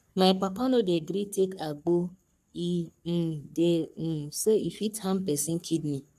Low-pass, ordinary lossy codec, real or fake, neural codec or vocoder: 14.4 kHz; none; fake; codec, 44.1 kHz, 3.4 kbps, Pupu-Codec